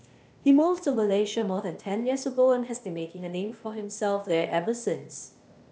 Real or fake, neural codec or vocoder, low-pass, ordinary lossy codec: fake; codec, 16 kHz, 0.8 kbps, ZipCodec; none; none